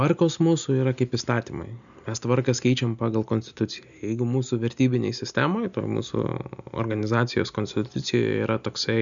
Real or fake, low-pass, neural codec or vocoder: real; 7.2 kHz; none